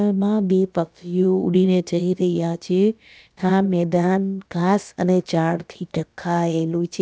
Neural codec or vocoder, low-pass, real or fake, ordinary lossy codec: codec, 16 kHz, about 1 kbps, DyCAST, with the encoder's durations; none; fake; none